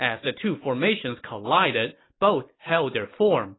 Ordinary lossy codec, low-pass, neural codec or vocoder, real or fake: AAC, 16 kbps; 7.2 kHz; none; real